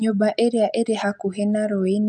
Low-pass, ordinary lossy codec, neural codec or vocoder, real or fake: none; none; none; real